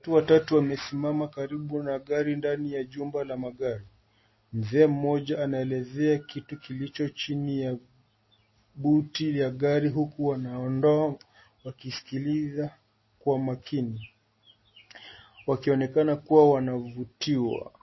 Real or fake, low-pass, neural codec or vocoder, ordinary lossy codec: real; 7.2 kHz; none; MP3, 24 kbps